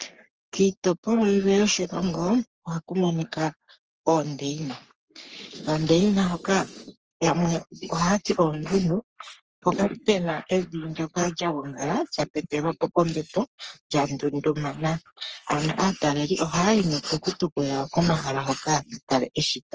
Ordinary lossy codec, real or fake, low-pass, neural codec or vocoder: Opus, 24 kbps; fake; 7.2 kHz; codec, 44.1 kHz, 3.4 kbps, Pupu-Codec